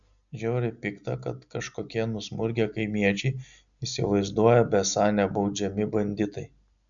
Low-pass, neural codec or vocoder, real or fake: 7.2 kHz; none; real